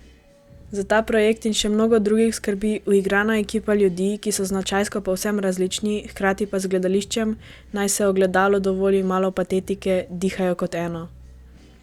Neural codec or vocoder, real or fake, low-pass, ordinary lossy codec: none; real; 19.8 kHz; Opus, 64 kbps